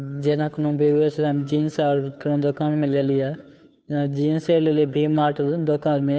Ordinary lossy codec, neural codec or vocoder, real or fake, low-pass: none; codec, 16 kHz, 2 kbps, FunCodec, trained on Chinese and English, 25 frames a second; fake; none